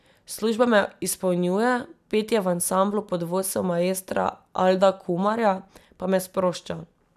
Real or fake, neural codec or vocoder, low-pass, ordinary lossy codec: real; none; 14.4 kHz; none